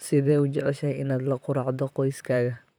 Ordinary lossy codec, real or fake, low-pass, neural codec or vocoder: none; fake; none; vocoder, 44.1 kHz, 128 mel bands, Pupu-Vocoder